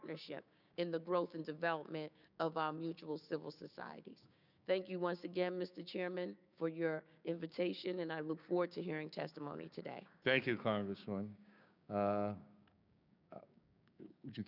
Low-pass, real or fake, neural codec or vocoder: 5.4 kHz; fake; codec, 16 kHz, 6 kbps, DAC